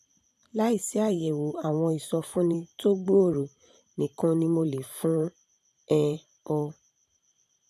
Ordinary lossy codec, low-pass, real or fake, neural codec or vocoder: none; 14.4 kHz; fake; vocoder, 44.1 kHz, 128 mel bands every 512 samples, BigVGAN v2